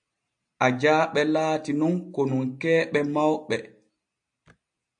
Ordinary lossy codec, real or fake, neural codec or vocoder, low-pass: AAC, 64 kbps; real; none; 9.9 kHz